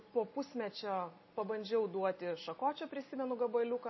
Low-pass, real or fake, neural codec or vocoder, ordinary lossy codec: 7.2 kHz; real; none; MP3, 24 kbps